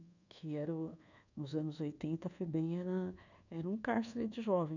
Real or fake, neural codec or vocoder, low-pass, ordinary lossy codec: fake; codec, 24 kHz, 1.2 kbps, DualCodec; 7.2 kHz; none